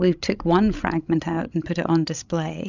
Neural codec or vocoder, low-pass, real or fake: codec, 16 kHz, 16 kbps, FreqCodec, larger model; 7.2 kHz; fake